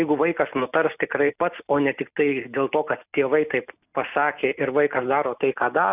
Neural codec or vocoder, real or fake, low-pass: none; real; 3.6 kHz